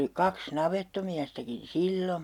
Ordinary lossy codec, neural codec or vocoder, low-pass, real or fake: none; none; 19.8 kHz; real